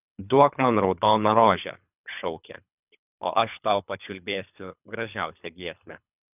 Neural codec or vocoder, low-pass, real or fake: codec, 24 kHz, 3 kbps, HILCodec; 3.6 kHz; fake